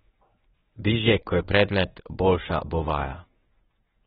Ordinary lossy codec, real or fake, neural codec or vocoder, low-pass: AAC, 16 kbps; fake; codec, 24 kHz, 0.9 kbps, WavTokenizer, medium speech release version 1; 10.8 kHz